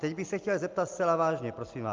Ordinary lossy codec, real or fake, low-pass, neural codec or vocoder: Opus, 24 kbps; real; 7.2 kHz; none